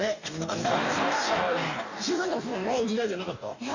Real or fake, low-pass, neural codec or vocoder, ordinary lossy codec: fake; 7.2 kHz; codec, 44.1 kHz, 2.6 kbps, DAC; none